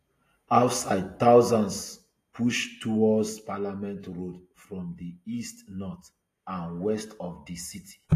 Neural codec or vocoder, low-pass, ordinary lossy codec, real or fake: none; 14.4 kHz; AAC, 48 kbps; real